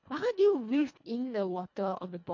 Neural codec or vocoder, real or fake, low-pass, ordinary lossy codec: codec, 24 kHz, 1.5 kbps, HILCodec; fake; 7.2 kHz; MP3, 48 kbps